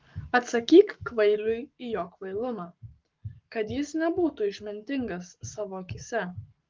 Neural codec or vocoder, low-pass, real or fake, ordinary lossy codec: codec, 44.1 kHz, 7.8 kbps, Pupu-Codec; 7.2 kHz; fake; Opus, 24 kbps